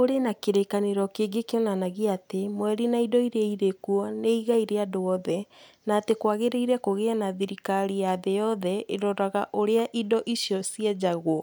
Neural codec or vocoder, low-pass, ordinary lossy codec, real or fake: none; none; none; real